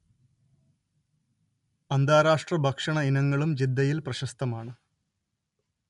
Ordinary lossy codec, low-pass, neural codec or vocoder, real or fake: MP3, 64 kbps; 10.8 kHz; none; real